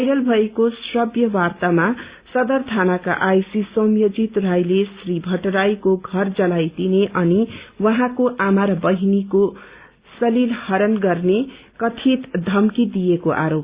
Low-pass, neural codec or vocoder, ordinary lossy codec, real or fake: 3.6 kHz; none; Opus, 64 kbps; real